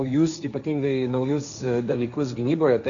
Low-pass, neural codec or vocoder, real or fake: 7.2 kHz; codec, 16 kHz, 1.1 kbps, Voila-Tokenizer; fake